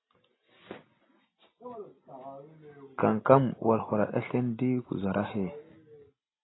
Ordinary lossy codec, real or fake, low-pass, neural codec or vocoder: AAC, 16 kbps; real; 7.2 kHz; none